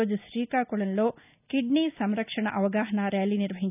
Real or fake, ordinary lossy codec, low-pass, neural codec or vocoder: real; none; 3.6 kHz; none